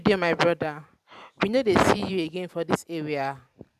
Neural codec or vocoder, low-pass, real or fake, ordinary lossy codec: vocoder, 44.1 kHz, 128 mel bands every 512 samples, BigVGAN v2; 14.4 kHz; fake; none